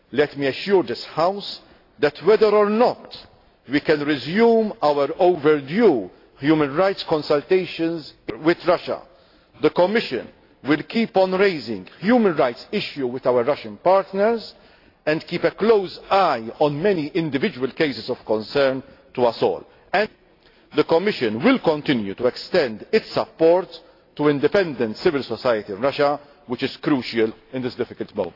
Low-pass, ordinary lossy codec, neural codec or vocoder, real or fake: 5.4 kHz; AAC, 32 kbps; none; real